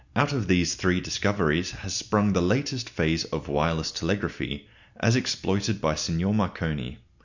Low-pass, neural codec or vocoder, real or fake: 7.2 kHz; none; real